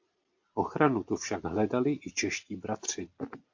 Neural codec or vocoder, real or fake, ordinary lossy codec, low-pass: none; real; AAC, 48 kbps; 7.2 kHz